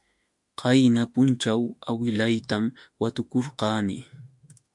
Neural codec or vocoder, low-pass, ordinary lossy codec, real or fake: autoencoder, 48 kHz, 32 numbers a frame, DAC-VAE, trained on Japanese speech; 10.8 kHz; MP3, 64 kbps; fake